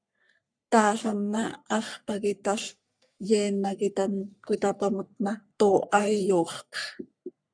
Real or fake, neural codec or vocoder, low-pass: fake; codec, 44.1 kHz, 3.4 kbps, Pupu-Codec; 9.9 kHz